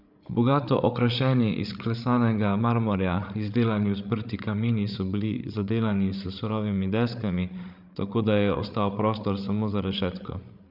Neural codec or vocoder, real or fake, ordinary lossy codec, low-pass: codec, 16 kHz, 8 kbps, FreqCodec, larger model; fake; none; 5.4 kHz